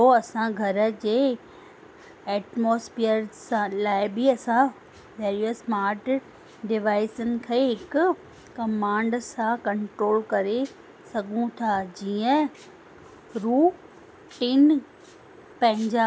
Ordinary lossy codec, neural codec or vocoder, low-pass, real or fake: none; none; none; real